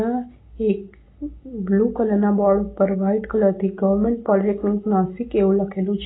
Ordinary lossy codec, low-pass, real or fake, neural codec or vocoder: AAC, 16 kbps; 7.2 kHz; real; none